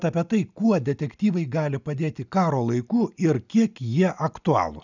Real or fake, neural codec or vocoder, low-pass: real; none; 7.2 kHz